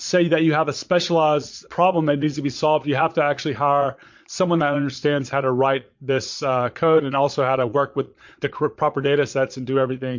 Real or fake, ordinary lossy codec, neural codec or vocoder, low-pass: fake; MP3, 48 kbps; vocoder, 22.05 kHz, 80 mel bands, Vocos; 7.2 kHz